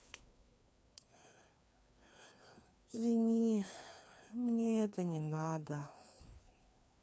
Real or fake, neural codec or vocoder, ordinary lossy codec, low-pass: fake; codec, 16 kHz, 2 kbps, FreqCodec, larger model; none; none